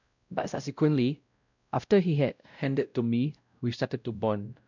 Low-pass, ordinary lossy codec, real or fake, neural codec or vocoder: 7.2 kHz; none; fake; codec, 16 kHz, 0.5 kbps, X-Codec, WavLM features, trained on Multilingual LibriSpeech